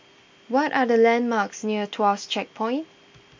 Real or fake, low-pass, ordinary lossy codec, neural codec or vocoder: real; 7.2 kHz; MP3, 48 kbps; none